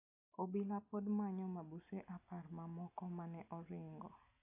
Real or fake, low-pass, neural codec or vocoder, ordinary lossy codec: real; 3.6 kHz; none; AAC, 32 kbps